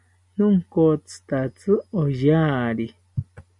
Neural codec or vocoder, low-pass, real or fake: none; 10.8 kHz; real